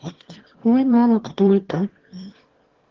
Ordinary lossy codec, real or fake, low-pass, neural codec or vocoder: Opus, 16 kbps; fake; 7.2 kHz; autoencoder, 22.05 kHz, a latent of 192 numbers a frame, VITS, trained on one speaker